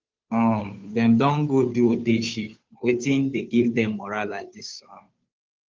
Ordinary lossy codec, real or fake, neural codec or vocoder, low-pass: Opus, 24 kbps; fake; codec, 16 kHz, 2 kbps, FunCodec, trained on Chinese and English, 25 frames a second; 7.2 kHz